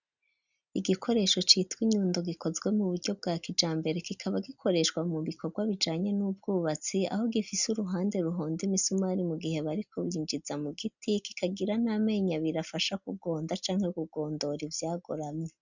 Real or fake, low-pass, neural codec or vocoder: real; 7.2 kHz; none